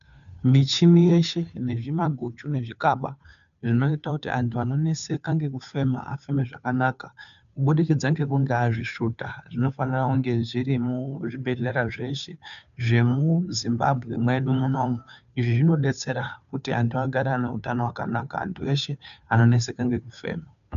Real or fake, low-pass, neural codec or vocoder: fake; 7.2 kHz; codec, 16 kHz, 2 kbps, FunCodec, trained on Chinese and English, 25 frames a second